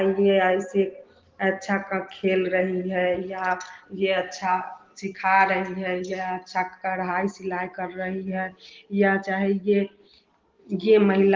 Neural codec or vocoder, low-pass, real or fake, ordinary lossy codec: none; 7.2 kHz; real; Opus, 16 kbps